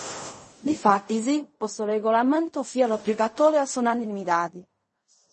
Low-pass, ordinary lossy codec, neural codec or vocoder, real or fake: 10.8 kHz; MP3, 32 kbps; codec, 16 kHz in and 24 kHz out, 0.4 kbps, LongCat-Audio-Codec, fine tuned four codebook decoder; fake